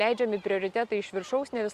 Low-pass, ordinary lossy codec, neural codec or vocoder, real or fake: 14.4 kHz; Opus, 64 kbps; none; real